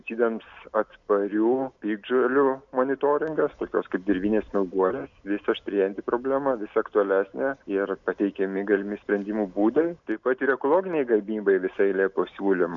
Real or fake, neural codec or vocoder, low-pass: real; none; 7.2 kHz